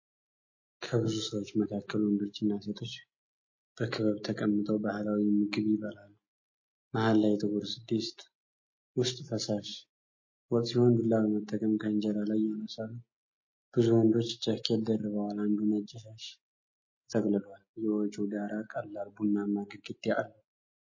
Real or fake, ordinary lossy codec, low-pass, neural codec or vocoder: real; MP3, 32 kbps; 7.2 kHz; none